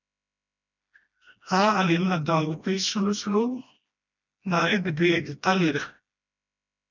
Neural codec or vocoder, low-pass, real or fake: codec, 16 kHz, 1 kbps, FreqCodec, smaller model; 7.2 kHz; fake